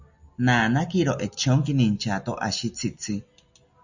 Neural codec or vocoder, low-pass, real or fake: none; 7.2 kHz; real